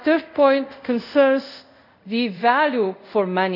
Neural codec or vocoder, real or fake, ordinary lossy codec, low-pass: codec, 24 kHz, 0.5 kbps, DualCodec; fake; none; 5.4 kHz